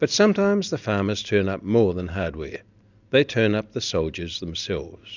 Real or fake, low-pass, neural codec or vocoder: real; 7.2 kHz; none